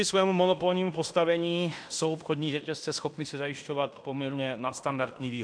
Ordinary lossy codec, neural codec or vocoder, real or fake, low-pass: AAC, 64 kbps; codec, 16 kHz in and 24 kHz out, 0.9 kbps, LongCat-Audio-Codec, fine tuned four codebook decoder; fake; 9.9 kHz